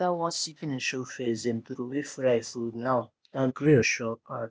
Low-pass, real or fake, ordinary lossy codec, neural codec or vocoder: none; fake; none; codec, 16 kHz, 0.8 kbps, ZipCodec